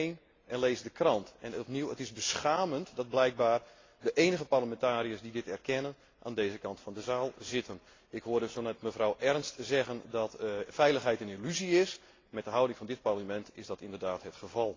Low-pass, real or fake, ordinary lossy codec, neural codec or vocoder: 7.2 kHz; real; AAC, 32 kbps; none